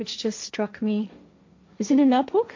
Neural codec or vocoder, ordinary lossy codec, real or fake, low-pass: codec, 16 kHz, 1.1 kbps, Voila-Tokenizer; MP3, 48 kbps; fake; 7.2 kHz